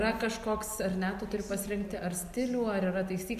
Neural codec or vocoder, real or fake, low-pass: none; real; 14.4 kHz